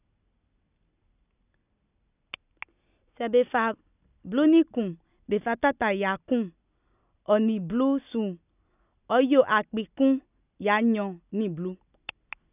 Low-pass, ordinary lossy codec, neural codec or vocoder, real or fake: 3.6 kHz; none; none; real